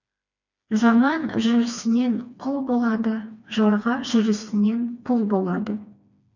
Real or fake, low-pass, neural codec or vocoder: fake; 7.2 kHz; codec, 16 kHz, 2 kbps, FreqCodec, smaller model